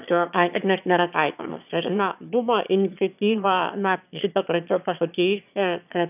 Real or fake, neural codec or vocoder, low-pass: fake; autoencoder, 22.05 kHz, a latent of 192 numbers a frame, VITS, trained on one speaker; 3.6 kHz